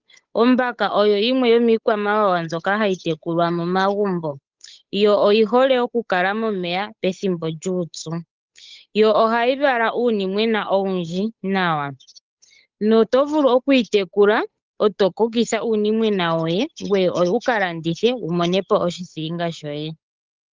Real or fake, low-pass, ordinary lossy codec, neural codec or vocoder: fake; 7.2 kHz; Opus, 24 kbps; codec, 16 kHz, 8 kbps, FunCodec, trained on Chinese and English, 25 frames a second